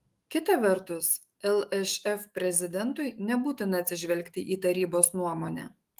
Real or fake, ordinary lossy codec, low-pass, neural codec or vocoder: fake; Opus, 24 kbps; 14.4 kHz; autoencoder, 48 kHz, 128 numbers a frame, DAC-VAE, trained on Japanese speech